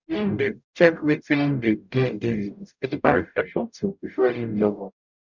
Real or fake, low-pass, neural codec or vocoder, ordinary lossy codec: fake; 7.2 kHz; codec, 44.1 kHz, 0.9 kbps, DAC; none